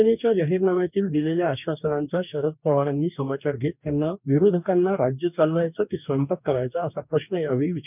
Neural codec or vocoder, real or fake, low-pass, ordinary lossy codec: codec, 44.1 kHz, 2.6 kbps, DAC; fake; 3.6 kHz; none